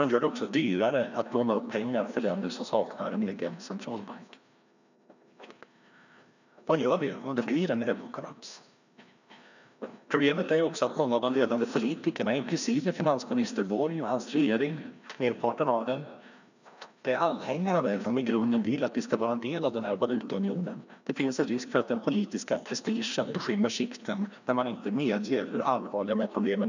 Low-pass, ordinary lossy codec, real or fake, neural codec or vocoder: 7.2 kHz; none; fake; codec, 16 kHz, 1 kbps, FreqCodec, larger model